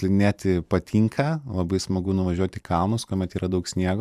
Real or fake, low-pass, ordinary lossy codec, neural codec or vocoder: fake; 14.4 kHz; AAC, 96 kbps; vocoder, 44.1 kHz, 128 mel bands every 512 samples, BigVGAN v2